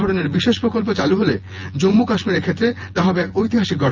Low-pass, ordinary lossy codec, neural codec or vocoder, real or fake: 7.2 kHz; Opus, 24 kbps; vocoder, 24 kHz, 100 mel bands, Vocos; fake